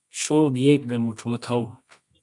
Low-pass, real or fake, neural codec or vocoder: 10.8 kHz; fake; codec, 24 kHz, 0.9 kbps, WavTokenizer, medium music audio release